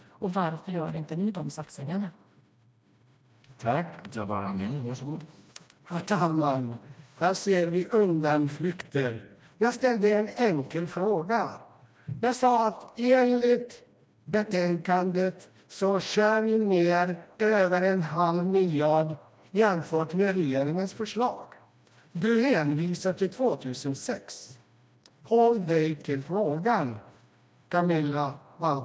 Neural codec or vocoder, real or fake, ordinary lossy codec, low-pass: codec, 16 kHz, 1 kbps, FreqCodec, smaller model; fake; none; none